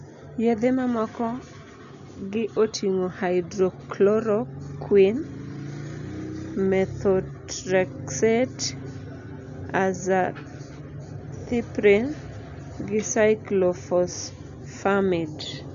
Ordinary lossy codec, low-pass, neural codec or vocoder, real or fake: none; 7.2 kHz; none; real